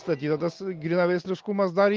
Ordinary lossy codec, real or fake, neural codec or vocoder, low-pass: Opus, 24 kbps; real; none; 7.2 kHz